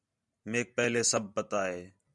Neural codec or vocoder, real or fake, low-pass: vocoder, 44.1 kHz, 128 mel bands every 512 samples, BigVGAN v2; fake; 10.8 kHz